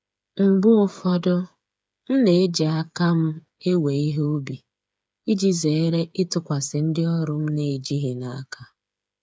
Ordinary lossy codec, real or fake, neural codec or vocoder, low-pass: none; fake; codec, 16 kHz, 8 kbps, FreqCodec, smaller model; none